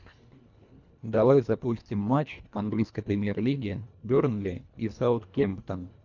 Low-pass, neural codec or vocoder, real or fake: 7.2 kHz; codec, 24 kHz, 1.5 kbps, HILCodec; fake